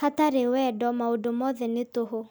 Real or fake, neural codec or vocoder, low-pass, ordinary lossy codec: real; none; none; none